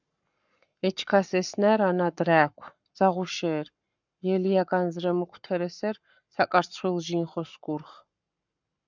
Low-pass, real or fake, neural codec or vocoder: 7.2 kHz; fake; codec, 44.1 kHz, 7.8 kbps, Pupu-Codec